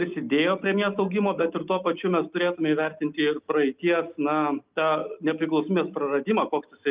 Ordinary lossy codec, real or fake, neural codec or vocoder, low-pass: Opus, 24 kbps; real; none; 3.6 kHz